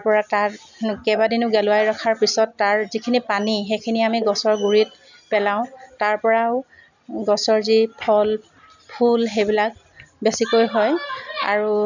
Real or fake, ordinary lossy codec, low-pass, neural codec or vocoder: real; none; 7.2 kHz; none